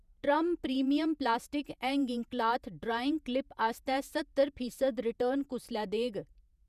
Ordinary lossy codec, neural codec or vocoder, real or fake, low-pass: none; vocoder, 48 kHz, 128 mel bands, Vocos; fake; 14.4 kHz